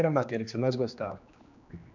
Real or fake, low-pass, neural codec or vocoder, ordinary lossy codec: fake; 7.2 kHz; codec, 16 kHz, 2 kbps, X-Codec, HuBERT features, trained on general audio; none